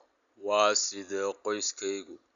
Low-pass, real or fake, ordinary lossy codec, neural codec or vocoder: 7.2 kHz; real; none; none